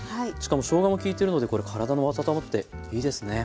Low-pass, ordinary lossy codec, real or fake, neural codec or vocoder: none; none; real; none